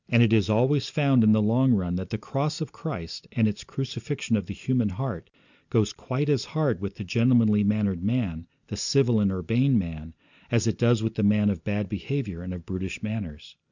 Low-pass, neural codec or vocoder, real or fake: 7.2 kHz; none; real